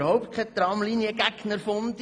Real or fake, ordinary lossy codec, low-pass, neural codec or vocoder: real; none; 9.9 kHz; none